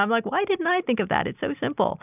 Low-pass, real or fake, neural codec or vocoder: 3.6 kHz; real; none